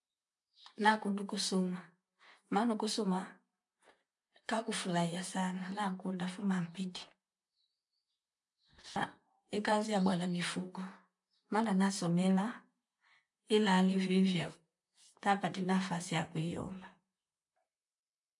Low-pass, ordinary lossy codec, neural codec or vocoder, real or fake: 10.8 kHz; none; vocoder, 44.1 kHz, 128 mel bands, Pupu-Vocoder; fake